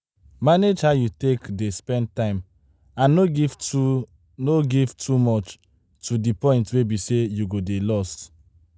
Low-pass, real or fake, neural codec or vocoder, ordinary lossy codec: none; real; none; none